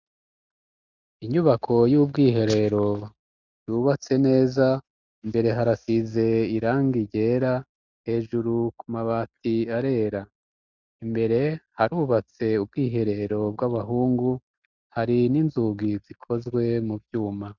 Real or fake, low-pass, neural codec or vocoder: real; 7.2 kHz; none